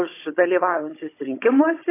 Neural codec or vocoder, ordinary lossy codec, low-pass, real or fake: codec, 16 kHz, 16 kbps, FunCodec, trained on Chinese and English, 50 frames a second; AAC, 24 kbps; 3.6 kHz; fake